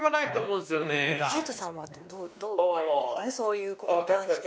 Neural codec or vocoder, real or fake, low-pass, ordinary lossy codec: codec, 16 kHz, 2 kbps, X-Codec, WavLM features, trained on Multilingual LibriSpeech; fake; none; none